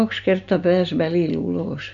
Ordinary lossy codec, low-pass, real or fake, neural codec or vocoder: none; 7.2 kHz; real; none